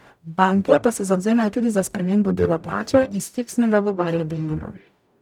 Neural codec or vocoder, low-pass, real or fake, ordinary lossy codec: codec, 44.1 kHz, 0.9 kbps, DAC; 19.8 kHz; fake; none